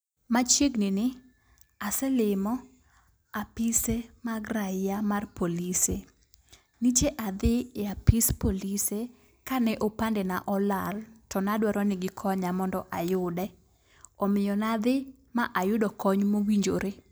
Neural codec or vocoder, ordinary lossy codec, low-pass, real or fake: none; none; none; real